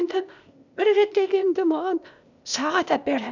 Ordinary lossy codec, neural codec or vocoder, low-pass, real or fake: none; codec, 16 kHz, 0.8 kbps, ZipCodec; 7.2 kHz; fake